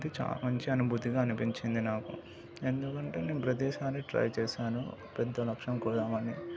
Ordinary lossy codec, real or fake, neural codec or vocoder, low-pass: none; real; none; none